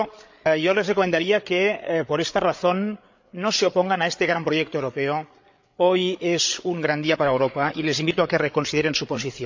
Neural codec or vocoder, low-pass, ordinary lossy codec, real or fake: codec, 16 kHz, 8 kbps, FreqCodec, larger model; 7.2 kHz; MP3, 64 kbps; fake